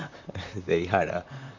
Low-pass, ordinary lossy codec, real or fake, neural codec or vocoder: 7.2 kHz; none; fake; vocoder, 44.1 kHz, 128 mel bands, Pupu-Vocoder